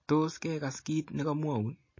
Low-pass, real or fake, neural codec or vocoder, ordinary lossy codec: 7.2 kHz; real; none; MP3, 32 kbps